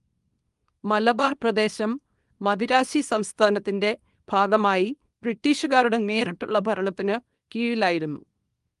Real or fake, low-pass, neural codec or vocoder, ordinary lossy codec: fake; 10.8 kHz; codec, 24 kHz, 0.9 kbps, WavTokenizer, small release; Opus, 32 kbps